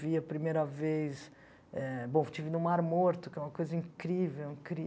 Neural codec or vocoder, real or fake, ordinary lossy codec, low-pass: none; real; none; none